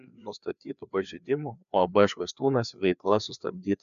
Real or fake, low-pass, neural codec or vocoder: fake; 7.2 kHz; codec, 16 kHz, 2 kbps, FreqCodec, larger model